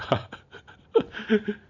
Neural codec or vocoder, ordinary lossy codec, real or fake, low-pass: none; Opus, 64 kbps; real; 7.2 kHz